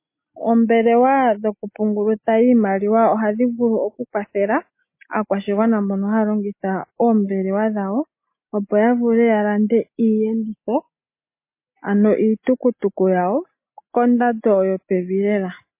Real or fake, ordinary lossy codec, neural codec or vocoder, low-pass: real; MP3, 24 kbps; none; 3.6 kHz